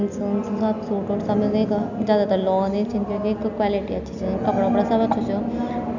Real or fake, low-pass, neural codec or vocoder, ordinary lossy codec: real; 7.2 kHz; none; none